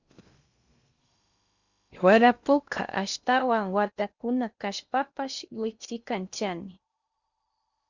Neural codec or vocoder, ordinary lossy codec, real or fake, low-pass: codec, 16 kHz in and 24 kHz out, 0.8 kbps, FocalCodec, streaming, 65536 codes; Opus, 64 kbps; fake; 7.2 kHz